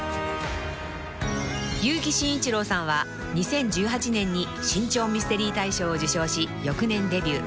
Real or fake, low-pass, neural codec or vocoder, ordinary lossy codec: real; none; none; none